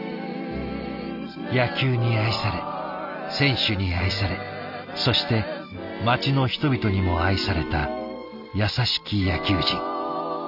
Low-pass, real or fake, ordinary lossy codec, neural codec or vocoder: 5.4 kHz; real; none; none